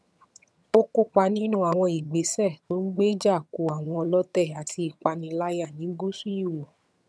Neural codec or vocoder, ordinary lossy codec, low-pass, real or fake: vocoder, 22.05 kHz, 80 mel bands, HiFi-GAN; none; none; fake